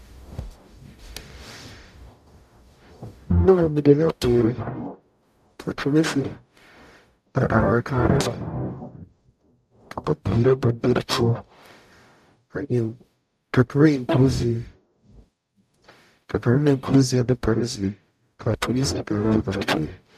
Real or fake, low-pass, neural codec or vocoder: fake; 14.4 kHz; codec, 44.1 kHz, 0.9 kbps, DAC